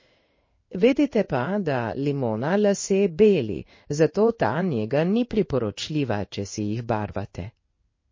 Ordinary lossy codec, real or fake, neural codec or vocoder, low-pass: MP3, 32 kbps; fake; codec, 16 kHz in and 24 kHz out, 1 kbps, XY-Tokenizer; 7.2 kHz